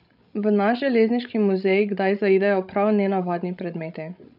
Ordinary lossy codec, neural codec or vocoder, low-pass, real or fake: none; codec, 16 kHz, 16 kbps, FreqCodec, larger model; 5.4 kHz; fake